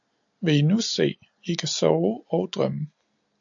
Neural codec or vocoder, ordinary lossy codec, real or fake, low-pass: none; AAC, 64 kbps; real; 7.2 kHz